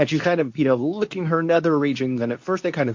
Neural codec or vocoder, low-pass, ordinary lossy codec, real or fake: codec, 24 kHz, 0.9 kbps, WavTokenizer, medium speech release version 2; 7.2 kHz; MP3, 48 kbps; fake